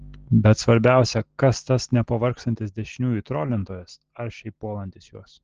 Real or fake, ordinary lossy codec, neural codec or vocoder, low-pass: real; Opus, 16 kbps; none; 7.2 kHz